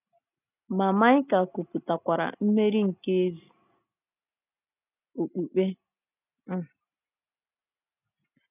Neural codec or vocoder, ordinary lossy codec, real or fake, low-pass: none; none; real; 3.6 kHz